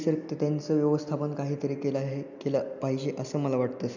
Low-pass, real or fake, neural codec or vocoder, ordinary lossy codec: 7.2 kHz; real; none; none